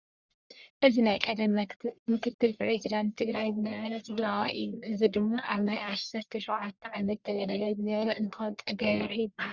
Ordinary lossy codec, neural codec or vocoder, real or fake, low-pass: Opus, 64 kbps; codec, 44.1 kHz, 1.7 kbps, Pupu-Codec; fake; 7.2 kHz